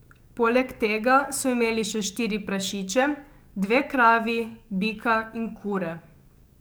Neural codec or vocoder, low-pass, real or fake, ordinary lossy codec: codec, 44.1 kHz, 7.8 kbps, DAC; none; fake; none